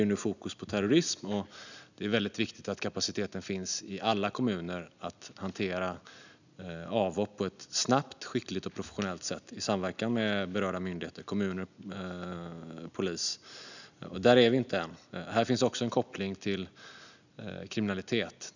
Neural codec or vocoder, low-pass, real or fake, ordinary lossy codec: none; 7.2 kHz; real; none